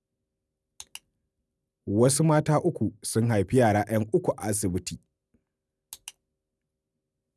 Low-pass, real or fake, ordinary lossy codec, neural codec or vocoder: none; real; none; none